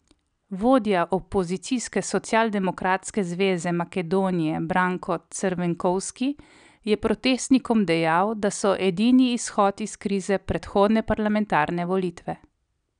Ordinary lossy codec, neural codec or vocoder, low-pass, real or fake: none; none; 9.9 kHz; real